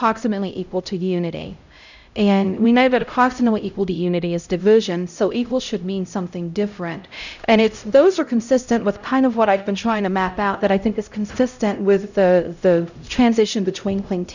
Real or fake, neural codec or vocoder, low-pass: fake; codec, 16 kHz, 0.5 kbps, X-Codec, HuBERT features, trained on LibriSpeech; 7.2 kHz